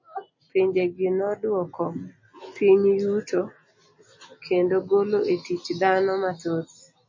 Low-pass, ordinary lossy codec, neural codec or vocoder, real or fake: 7.2 kHz; MP3, 32 kbps; none; real